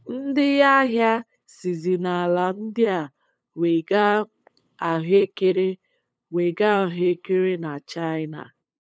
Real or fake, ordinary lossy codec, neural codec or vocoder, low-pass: fake; none; codec, 16 kHz, 8 kbps, FunCodec, trained on LibriTTS, 25 frames a second; none